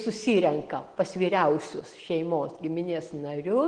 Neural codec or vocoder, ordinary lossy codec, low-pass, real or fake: none; Opus, 16 kbps; 9.9 kHz; real